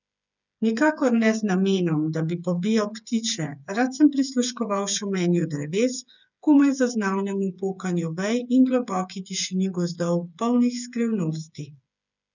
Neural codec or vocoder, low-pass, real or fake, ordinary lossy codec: codec, 16 kHz, 8 kbps, FreqCodec, smaller model; 7.2 kHz; fake; none